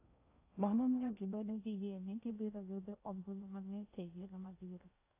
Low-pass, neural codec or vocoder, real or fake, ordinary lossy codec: 3.6 kHz; codec, 16 kHz in and 24 kHz out, 0.6 kbps, FocalCodec, streaming, 2048 codes; fake; AAC, 24 kbps